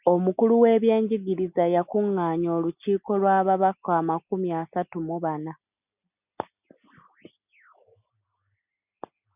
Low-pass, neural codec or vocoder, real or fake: 3.6 kHz; none; real